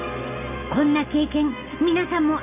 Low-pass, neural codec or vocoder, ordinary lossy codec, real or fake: 3.6 kHz; none; none; real